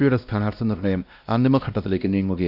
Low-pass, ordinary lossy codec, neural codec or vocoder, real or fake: 5.4 kHz; none; codec, 16 kHz, 1 kbps, X-Codec, WavLM features, trained on Multilingual LibriSpeech; fake